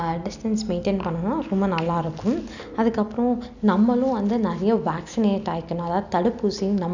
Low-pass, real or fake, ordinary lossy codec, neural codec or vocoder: 7.2 kHz; real; none; none